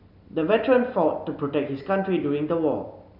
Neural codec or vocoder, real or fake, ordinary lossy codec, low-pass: none; real; none; 5.4 kHz